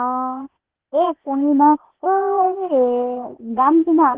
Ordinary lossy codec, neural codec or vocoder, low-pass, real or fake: Opus, 16 kbps; codec, 16 kHz, 0.8 kbps, ZipCodec; 3.6 kHz; fake